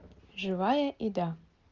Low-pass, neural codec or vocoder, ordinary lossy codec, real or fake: 7.2 kHz; none; Opus, 32 kbps; real